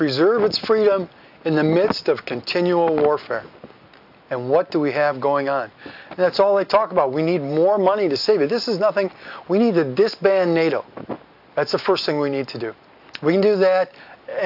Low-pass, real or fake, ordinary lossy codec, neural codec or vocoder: 5.4 kHz; real; AAC, 48 kbps; none